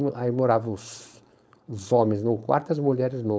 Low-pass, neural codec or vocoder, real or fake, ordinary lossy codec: none; codec, 16 kHz, 4.8 kbps, FACodec; fake; none